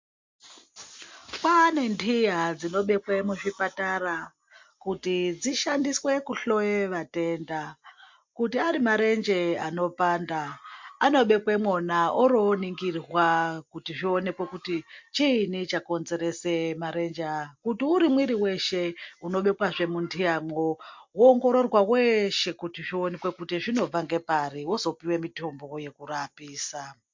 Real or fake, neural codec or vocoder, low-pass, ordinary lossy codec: real; none; 7.2 kHz; MP3, 48 kbps